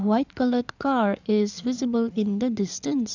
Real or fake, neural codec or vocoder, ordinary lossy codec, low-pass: fake; codec, 16 kHz in and 24 kHz out, 2.2 kbps, FireRedTTS-2 codec; none; 7.2 kHz